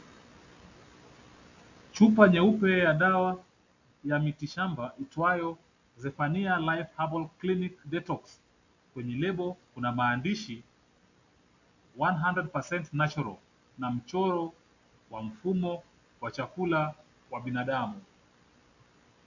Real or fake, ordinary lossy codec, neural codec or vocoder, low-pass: real; AAC, 48 kbps; none; 7.2 kHz